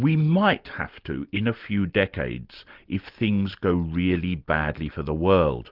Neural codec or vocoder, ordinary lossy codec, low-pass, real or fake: none; Opus, 32 kbps; 5.4 kHz; real